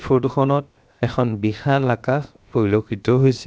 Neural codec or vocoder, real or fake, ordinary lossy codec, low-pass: codec, 16 kHz, 0.7 kbps, FocalCodec; fake; none; none